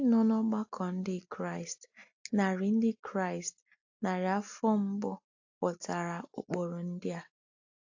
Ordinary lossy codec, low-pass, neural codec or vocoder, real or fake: AAC, 48 kbps; 7.2 kHz; none; real